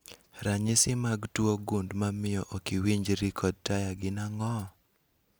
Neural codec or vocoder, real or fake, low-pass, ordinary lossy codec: none; real; none; none